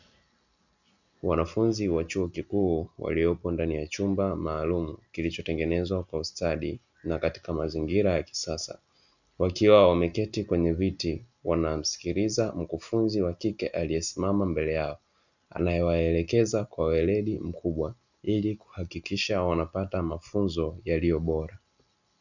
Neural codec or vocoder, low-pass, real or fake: none; 7.2 kHz; real